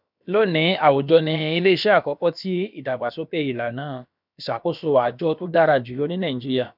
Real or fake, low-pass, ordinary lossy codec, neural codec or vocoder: fake; 5.4 kHz; none; codec, 16 kHz, about 1 kbps, DyCAST, with the encoder's durations